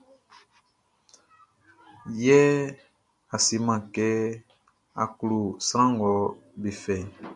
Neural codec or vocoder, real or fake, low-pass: none; real; 10.8 kHz